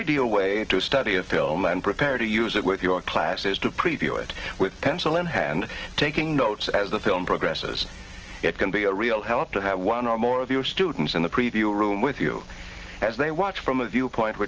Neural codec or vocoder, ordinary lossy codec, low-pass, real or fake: none; Opus, 16 kbps; 7.2 kHz; real